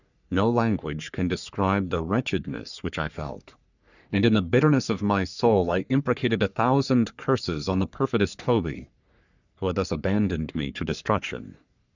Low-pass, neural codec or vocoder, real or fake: 7.2 kHz; codec, 44.1 kHz, 3.4 kbps, Pupu-Codec; fake